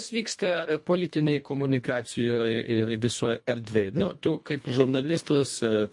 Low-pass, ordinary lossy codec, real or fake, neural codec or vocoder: 10.8 kHz; MP3, 48 kbps; fake; codec, 24 kHz, 1.5 kbps, HILCodec